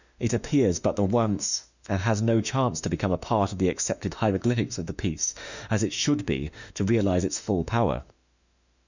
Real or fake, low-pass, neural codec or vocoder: fake; 7.2 kHz; autoencoder, 48 kHz, 32 numbers a frame, DAC-VAE, trained on Japanese speech